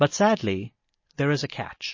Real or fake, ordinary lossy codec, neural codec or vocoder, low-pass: real; MP3, 32 kbps; none; 7.2 kHz